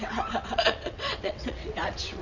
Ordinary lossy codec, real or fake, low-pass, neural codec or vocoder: none; fake; 7.2 kHz; vocoder, 22.05 kHz, 80 mel bands, WaveNeXt